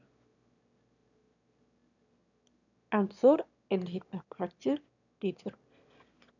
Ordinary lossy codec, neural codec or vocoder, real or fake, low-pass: none; autoencoder, 22.05 kHz, a latent of 192 numbers a frame, VITS, trained on one speaker; fake; 7.2 kHz